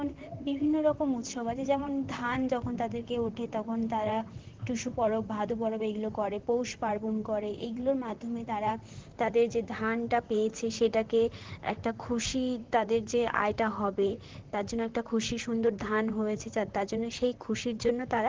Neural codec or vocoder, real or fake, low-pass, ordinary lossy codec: vocoder, 44.1 kHz, 128 mel bands, Pupu-Vocoder; fake; 7.2 kHz; Opus, 16 kbps